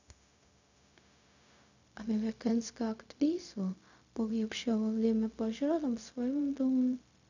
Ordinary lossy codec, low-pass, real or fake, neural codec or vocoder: none; 7.2 kHz; fake; codec, 16 kHz, 0.4 kbps, LongCat-Audio-Codec